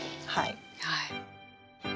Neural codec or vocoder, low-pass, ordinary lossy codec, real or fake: none; none; none; real